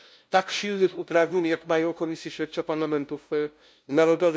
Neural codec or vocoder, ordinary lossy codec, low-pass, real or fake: codec, 16 kHz, 0.5 kbps, FunCodec, trained on LibriTTS, 25 frames a second; none; none; fake